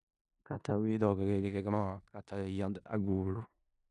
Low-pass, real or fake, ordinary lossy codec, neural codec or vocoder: 10.8 kHz; fake; none; codec, 16 kHz in and 24 kHz out, 0.4 kbps, LongCat-Audio-Codec, four codebook decoder